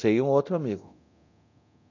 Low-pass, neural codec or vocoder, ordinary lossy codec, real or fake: 7.2 kHz; codec, 24 kHz, 0.9 kbps, DualCodec; none; fake